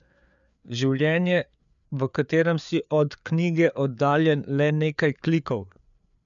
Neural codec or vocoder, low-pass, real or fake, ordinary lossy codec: codec, 16 kHz, 4 kbps, FreqCodec, larger model; 7.2 kHz; fake; MP3, 96 kbps